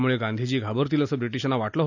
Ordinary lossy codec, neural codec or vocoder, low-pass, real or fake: none; none; 7.2 kHz; real